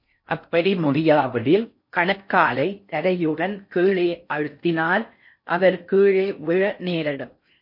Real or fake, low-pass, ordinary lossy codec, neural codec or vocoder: fake; 5.4 kHz; MP3, 32 kbps; codec, 16 kHz in and 24 kHz out, 0.6 kbps, FocalCodec, streaming, 4096 codes